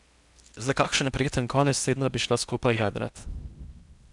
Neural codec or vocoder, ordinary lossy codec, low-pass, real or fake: codec, 16 kHz in and 24 kHz out, 0.8 kbps, FocalCodec, streaming, 65536 codes; none; 10.8 kHz; fake